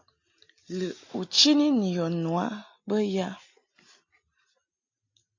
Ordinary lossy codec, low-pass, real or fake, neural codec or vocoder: AAC, 48 kbps; 7.2 kHz; real; none